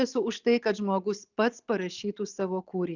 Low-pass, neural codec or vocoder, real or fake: 7.2 kHz; none; real